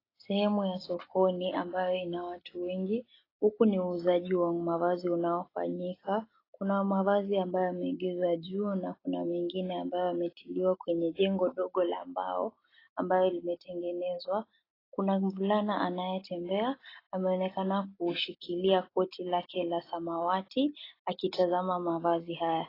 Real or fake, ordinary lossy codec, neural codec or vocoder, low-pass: real; AAC, 24 kbps; none; 5.4 kHz